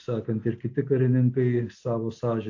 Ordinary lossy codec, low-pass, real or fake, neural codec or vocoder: AAC, 48 kbps; 7.2 kHz; real; none